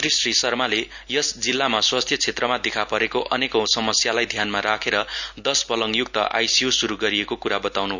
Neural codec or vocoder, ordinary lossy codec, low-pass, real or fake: none; none; 7.2 kHz; real